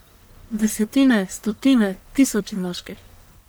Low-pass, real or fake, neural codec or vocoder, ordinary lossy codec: none; fake; codec, 44.1 kHz, 1.7 kbps, Pupu-Codec; none